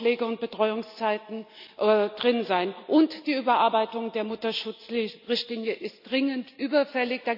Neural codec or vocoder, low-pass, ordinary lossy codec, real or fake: none; 5.4 kHz; none; real